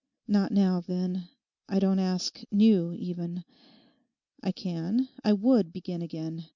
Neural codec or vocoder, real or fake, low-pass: none; real; 7.2 kHz